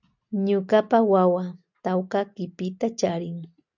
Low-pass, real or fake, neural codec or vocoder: 7.2 kHz; real; none